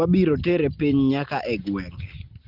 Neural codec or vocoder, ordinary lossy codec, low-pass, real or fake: none; Opus, 16 kbps; 5.4 kHz; real